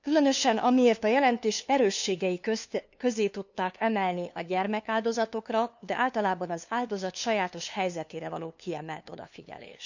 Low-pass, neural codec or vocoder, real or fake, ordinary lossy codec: 7.2 kHz; codec, 16 kHz, 2 kbps, FunCodec, trained on LibriTTS, 25 frames a second; fake; none